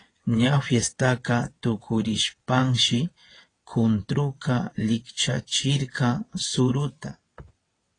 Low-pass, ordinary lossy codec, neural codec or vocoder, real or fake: 9.9 kHz; AAC, 32 kbps; vocoder, 22.05 kHz, 80 mel bands, WaveNeXt; fake